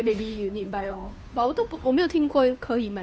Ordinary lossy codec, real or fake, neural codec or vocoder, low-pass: none; fake; codec, 16 kHz, 2 kbps, FunCodec, trained on Chinese and English, 25 frames a second; none